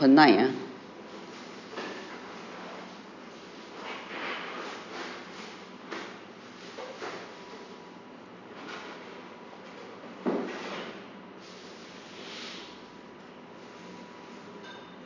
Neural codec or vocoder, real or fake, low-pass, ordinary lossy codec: none; real; 7.2 kHz; none